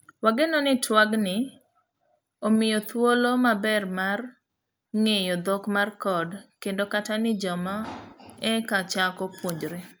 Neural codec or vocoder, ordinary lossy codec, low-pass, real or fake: none; none; none; real